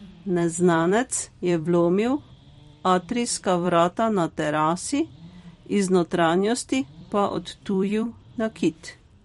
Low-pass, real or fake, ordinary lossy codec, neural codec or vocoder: 19.8 kHz; fake; MP3, 48 kbps; vocoder, 44.1 kHz, 128 mel bands every 256 samples, BigVGAN v2